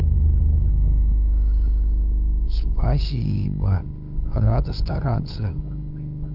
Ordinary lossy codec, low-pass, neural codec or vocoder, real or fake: none; 5.4 kHz; codec, 16 kHz, 8 kbps, FunCodec, trained on LibriTTS, 25 frames a second; fake